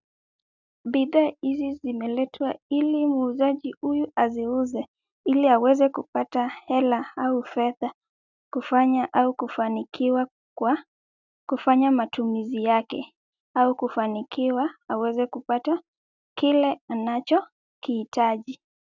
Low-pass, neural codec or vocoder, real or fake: 7.2 kHz; none; real